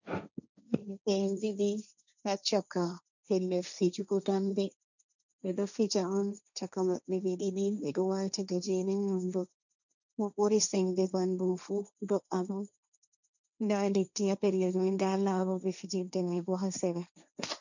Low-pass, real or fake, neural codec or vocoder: 7.2 kHz; fake; codec, 16 kHz, 1.1 kbps, Voila-Tokenizer